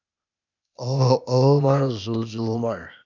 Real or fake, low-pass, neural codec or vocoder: fake; 7.2 kHz; codec, 16 kHz, 0.8 kbps, ZipCodec